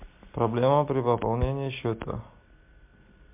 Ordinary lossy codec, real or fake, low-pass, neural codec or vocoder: MP3, 32 kbps; real; 3.6 kHz; none